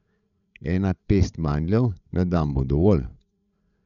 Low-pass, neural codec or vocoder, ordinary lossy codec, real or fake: 7.2 kHz; codec, 16 kHz, 8 kbps, FreqCodec, larger model; none; fake